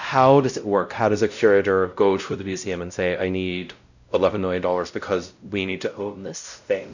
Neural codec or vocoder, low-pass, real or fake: codec, 16 kHz, 0.5 kbps, X-Codec, WavLM features, trained on Multilingual LibriSpeech; 7.2 kHz; fake